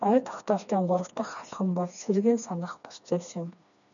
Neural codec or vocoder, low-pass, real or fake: codec, 16 kHz, 2 kbps, FreqCodec, smaller model; 7.2 kHz; fake